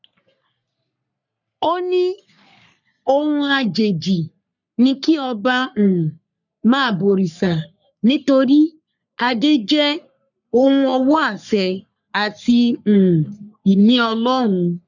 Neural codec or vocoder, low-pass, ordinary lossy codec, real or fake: codec, 44.1 kHz, 3.4 kbps, Pupu-Codec; 7.2 kHz; none; fake